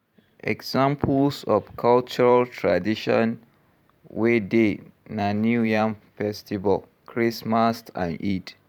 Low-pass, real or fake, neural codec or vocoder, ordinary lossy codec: 19.8 kHz; fake; vocoder, 44.1 kHz, 128 mel bands every 512 samples, BigVGAN v2; none